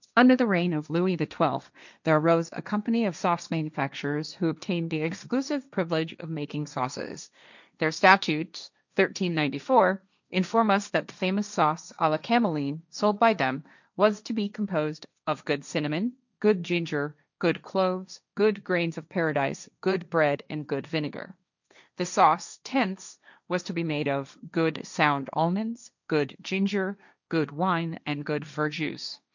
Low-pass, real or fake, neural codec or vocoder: 7.2 kHz; fake; codec, 16 kHz, 1.1 kbps, Voila-Tokenizer